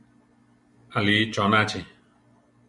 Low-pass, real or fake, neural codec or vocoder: 10.8 kHz; real; none